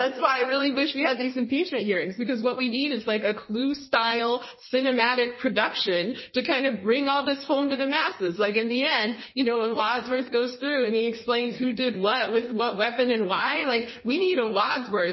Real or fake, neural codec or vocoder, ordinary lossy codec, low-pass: fake; codec, 16 kHz in and 24 kHz out, 1.1 kbps, FireRedTTS-2 codec; MP3, 24 kbps; 7.2 kHz